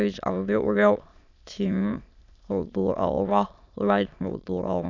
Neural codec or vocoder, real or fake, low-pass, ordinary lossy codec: autoencoder, 22.05 kHz, a latent of 192 numbers a frame, VITS, trained on many speakers; fake; 7.2 kHz; none